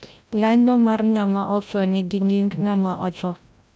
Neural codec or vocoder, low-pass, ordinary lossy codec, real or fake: codec, 16 kHz, 0.5 kbps, FreqCodec, larger model; none; none; fake